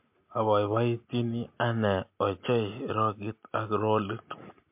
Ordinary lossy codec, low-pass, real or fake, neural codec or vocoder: MP3, 32 kbps; 3.6 kHz; real; none